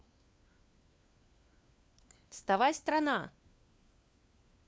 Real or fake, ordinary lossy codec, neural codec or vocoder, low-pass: fake; none; codec, 16 kHz, 4 kbps, FunCodec, trained on LibriTTS, 50 frames a second; none